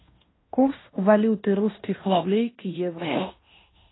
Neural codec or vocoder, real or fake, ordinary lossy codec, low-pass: codec, 16 kHz in and 24 kHz out, 0.9 kbps, LongCat-Audio-Codec, fine tuned four codebook decoder; fake; AAC, 16 kbps; 7.2 kHz